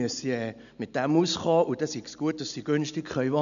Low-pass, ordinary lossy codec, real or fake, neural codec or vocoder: 7.2 kHz; none; real; none